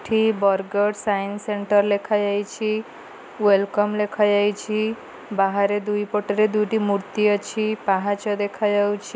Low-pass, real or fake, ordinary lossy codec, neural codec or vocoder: none; real; none; none